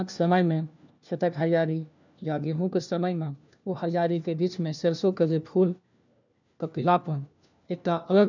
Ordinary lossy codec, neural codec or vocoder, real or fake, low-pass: none; codec, 16 kHz, 1 kbps, FunCodec, trained on LibriTTS, 50 frames a second; fake; 7.2 kHz